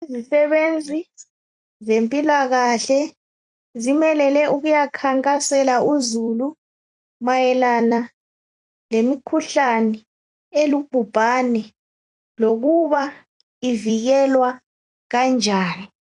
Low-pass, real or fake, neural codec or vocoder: 10.8 kHz; fake; vocoder, 44.1 kHz, 128 mel bands every 256 samples, BigVGAN v2